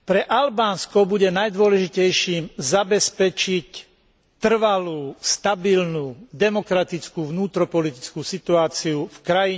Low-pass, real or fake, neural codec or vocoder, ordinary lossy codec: none; real; none; none